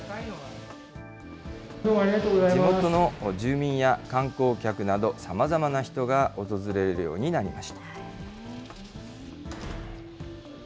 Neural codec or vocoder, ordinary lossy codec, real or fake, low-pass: none; none; real; none